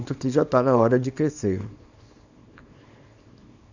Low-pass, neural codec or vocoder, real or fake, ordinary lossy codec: 7.2 kHz; codec, 24 kHz, 0.9 kbps, WavTokenizer, small release; fake; Opus, 64 kbps